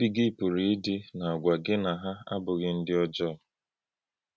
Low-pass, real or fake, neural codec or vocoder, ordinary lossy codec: none; real; none; none